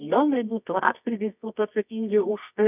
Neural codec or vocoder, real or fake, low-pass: codec, 24 kHz, 0.9 kbps, WavTokenizer, medium music audio release; fake; 3.6 kHz